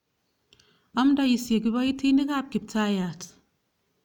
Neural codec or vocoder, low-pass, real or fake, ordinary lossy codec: none; 19.8 kHz; real; none